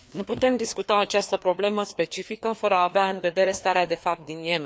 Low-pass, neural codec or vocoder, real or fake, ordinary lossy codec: none; codec, 16 kHz, 2 kbps, FreqCodec, larger model; fake; none